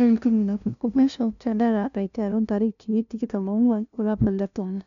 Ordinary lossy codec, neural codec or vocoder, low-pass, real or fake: none; codec, 16 kHz, 0.5 kbps, FunCodec, trained on LibriTTS, 25 frames a second; 7.2 kHz; fake